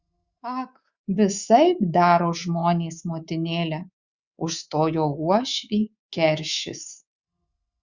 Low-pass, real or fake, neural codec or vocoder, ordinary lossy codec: 7.2 kHz; fake; codec, 24 kHz, 3.1 kbps, DualCodec; Opus, 64 kbps